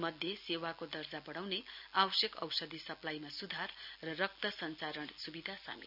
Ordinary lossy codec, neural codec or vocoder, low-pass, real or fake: none; none; 5.4 kHz; real